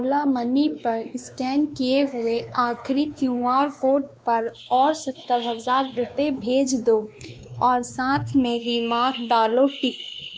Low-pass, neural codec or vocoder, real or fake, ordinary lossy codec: none; codec, 16 kHz, 2 kbps, X-Codec, WavLM features, trained on Multilingual LibriSpeech; fake; none